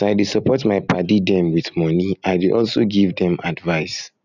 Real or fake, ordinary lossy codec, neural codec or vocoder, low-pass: real; none; none; 7.2 kHz